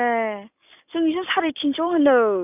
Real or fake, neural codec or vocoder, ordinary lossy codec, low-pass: real; none; none; 3.6 kHz